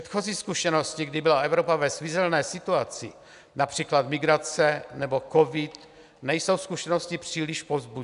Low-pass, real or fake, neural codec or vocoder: 10.8 kHz; real; none